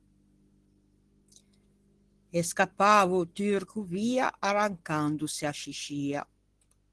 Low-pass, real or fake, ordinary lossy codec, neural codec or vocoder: 10.8 kHz; real; Opus, 16 kbps; none